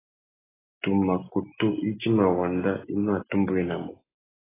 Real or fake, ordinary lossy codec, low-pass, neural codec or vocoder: real; AAC, 16 kbps; 3.6 kHz; none